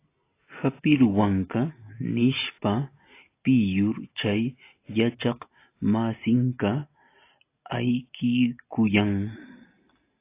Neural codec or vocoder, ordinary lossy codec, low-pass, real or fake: none; AAC, 24 kbps; 3.6 kHz; real